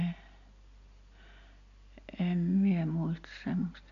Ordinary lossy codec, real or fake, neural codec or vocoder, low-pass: none; real; none; 7.2 kHz